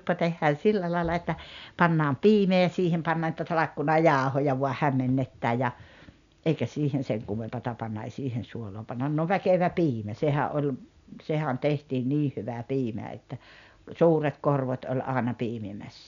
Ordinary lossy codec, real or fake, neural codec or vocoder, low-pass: none; real; none; 7.2 kHz